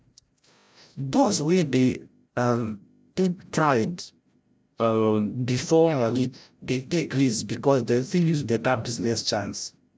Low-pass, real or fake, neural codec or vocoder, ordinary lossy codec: none; fake; codec, 16 kHz, 0.5 kbps, FreqCodec, larger model; none